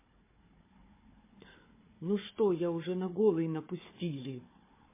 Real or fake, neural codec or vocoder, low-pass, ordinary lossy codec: fake; codec, 16 kHz, 4 kbps, FunCodec, trained on Chinese and English, 50 frames a second; 3.6 kHz; MP3, 16 kbps